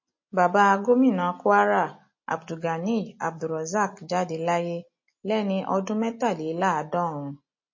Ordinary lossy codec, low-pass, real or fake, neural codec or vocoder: MP3, 32 kbps; 7.2 kHz; real; none